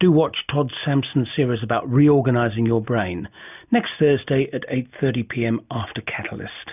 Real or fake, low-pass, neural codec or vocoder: real; 3.6 kHz; none